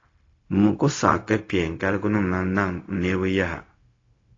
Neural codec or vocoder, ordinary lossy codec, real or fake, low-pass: codec, 16 kHz, 0.4 kbps, LongCat-Audio-Codec; AAC, 32 kbps; fake; 7.2 kHz